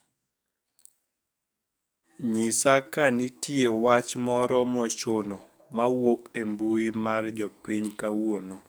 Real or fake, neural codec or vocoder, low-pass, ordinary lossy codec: fake; codec, 44.1 kHz, 2.6 kbps, SNAC; none; none